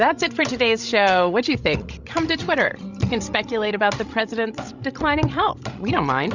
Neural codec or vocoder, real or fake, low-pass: codec, 16 kHz, 16 kbps, FreqCodec, larger model; fake; 7.2 kHz